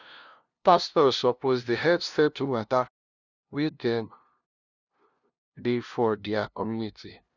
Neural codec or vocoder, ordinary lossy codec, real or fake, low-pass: codec, 16 kHz, 0.5 kbps, FunCodec, trained on LibriTTS, 25 frames a second; none; fake; 7.2 kHz